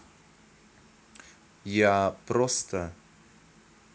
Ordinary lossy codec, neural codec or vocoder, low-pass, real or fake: none; none; none; real